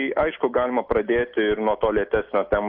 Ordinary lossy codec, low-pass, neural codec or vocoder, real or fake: AAC, 48 kbps; 5.4 kHz; none; real